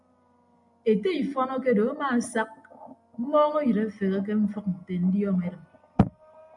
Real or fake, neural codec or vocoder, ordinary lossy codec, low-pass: real; none; MP3, 96 kbps; 10.8 kHz